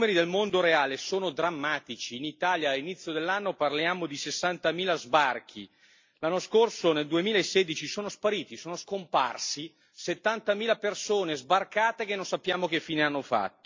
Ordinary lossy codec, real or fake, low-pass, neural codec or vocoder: MP3, 48 kbps; real; 7.2 kHz; none